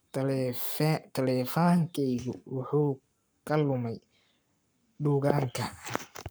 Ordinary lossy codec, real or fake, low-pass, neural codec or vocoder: none; fake; none; codec, 44.1 kHz, 7.8 kbps, Pupu-Codec